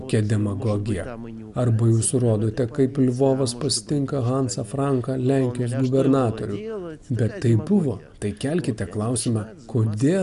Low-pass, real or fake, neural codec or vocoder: 10.8 kHz; real; none